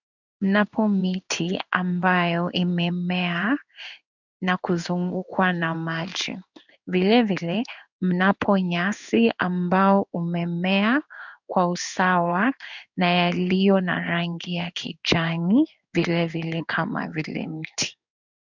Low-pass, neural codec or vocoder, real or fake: 7.2 kHz; codec, 16 kHz in and 24 kHz out, 1 kbps, XY-Tokenizer; fake